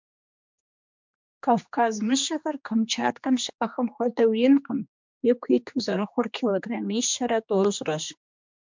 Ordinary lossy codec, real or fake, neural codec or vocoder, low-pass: MP3, 64 kbps; fake; codec, 16 kHz, 2 kbps, X-Codec, HuBERT features, trained on general audio; 7.2 kHz